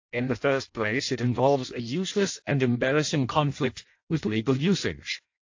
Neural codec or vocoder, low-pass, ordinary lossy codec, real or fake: codec, 16 kHz in and 24 kHz out, 0.6 kbps, FireRedTTS-2 codec; 7.2 kHz; AAC, 48 kbps; fake